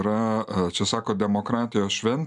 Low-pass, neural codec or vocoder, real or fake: 10.8 kHz; none; real